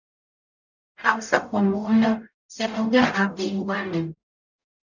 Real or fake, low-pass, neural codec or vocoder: fake; 7.2 kHz; codec, 44.1 kHz, 0.9 kbps, DAC